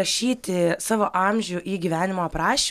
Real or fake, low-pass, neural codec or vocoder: real; 14.4 kHz; none